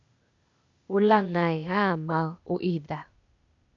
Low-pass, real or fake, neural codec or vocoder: 7.2 kHz; fake; codec, 16 kHz, 0.8 kbps, ZipCodec